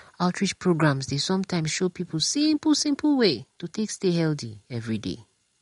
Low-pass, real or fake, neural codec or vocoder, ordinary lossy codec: 10.8 kHz; real; none; MP3, 48 kbps